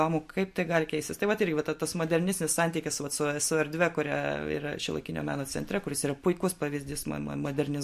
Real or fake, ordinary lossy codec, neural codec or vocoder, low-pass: real; MP3, 64 kbps; none; 14.4 kHz